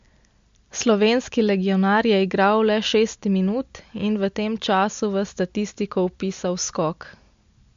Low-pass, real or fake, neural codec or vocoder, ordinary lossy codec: 7.2 kHz; real; none; MP3, 48 kbps